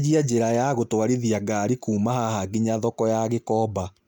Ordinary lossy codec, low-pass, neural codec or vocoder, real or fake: none; none; none; real